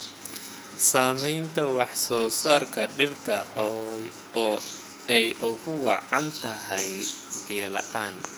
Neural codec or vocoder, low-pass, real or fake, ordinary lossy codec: codec, 44.1 kHz, 2.6 kbps, SNAC; none; fake; none